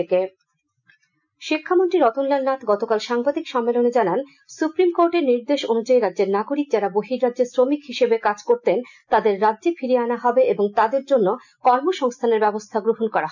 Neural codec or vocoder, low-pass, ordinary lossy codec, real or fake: none; 7.2 kHz; none; real